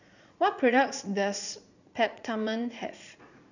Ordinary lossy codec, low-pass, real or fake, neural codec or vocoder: none; 7.2 kHz; fake; vocoder, 44.1 kHz, 80 mel bands, Vocos